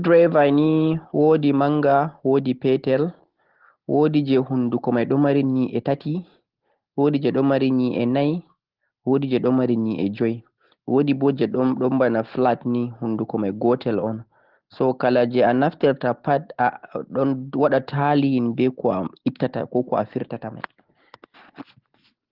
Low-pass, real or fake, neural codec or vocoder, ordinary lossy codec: 5.4 kHz; real; none; Opus, 16 kbps